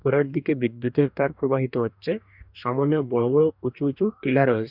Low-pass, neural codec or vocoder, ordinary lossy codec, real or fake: 5.4 kHz; codec, 44.1 kHz, 2.6 kbps, DAC; none; fake